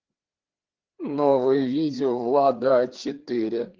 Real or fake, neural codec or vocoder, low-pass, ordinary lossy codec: fake; codec, 16 kHz, 4 kbps, FreqCodec, larger model; 7.2 kHz; Opus, 16 kbps